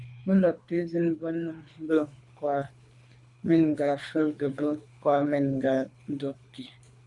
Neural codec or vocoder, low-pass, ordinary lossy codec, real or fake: codec, 24 kHz, 3 kbps, HILCodec; 10.8 kHz; MP3, 64 kbps; fake